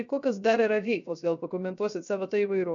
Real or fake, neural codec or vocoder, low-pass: fake; codec, 16 kHz, 0.3 kbps, FocalCodec; 7.2 kHz